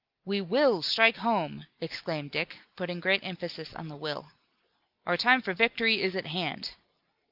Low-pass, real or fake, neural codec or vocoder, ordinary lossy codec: 5.4 kHz; real; none; Opus, 24 kbps